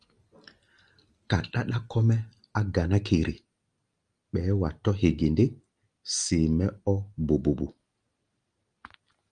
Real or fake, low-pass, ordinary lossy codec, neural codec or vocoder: real; 9.9 kHz; Opus, 32 kbps; none